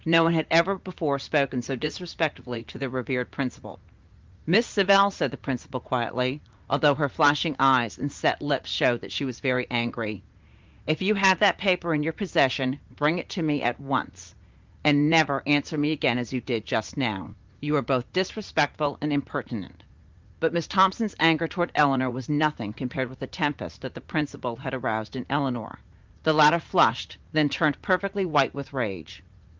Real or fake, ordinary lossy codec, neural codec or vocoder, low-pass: fake; Opus, 32 kbps; vocoder, 44.1 kHz, 80 mel bands, Vocos; 7.2 kHz